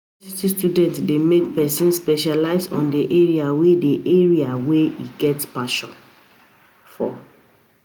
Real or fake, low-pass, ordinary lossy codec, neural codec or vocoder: real; none; none; none